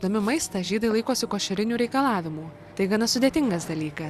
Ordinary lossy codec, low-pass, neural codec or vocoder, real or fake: Opus, 64 kbps; 14.4 kHz; none; real